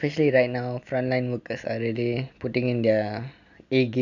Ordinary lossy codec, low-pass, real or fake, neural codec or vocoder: none; 7.2 kHz; real; none